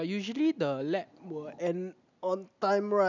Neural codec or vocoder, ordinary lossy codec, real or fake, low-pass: none; none; real; 7.2 kHz